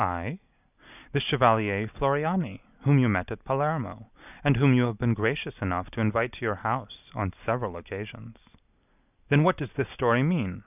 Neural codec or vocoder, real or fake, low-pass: none; real; 3.6 kHz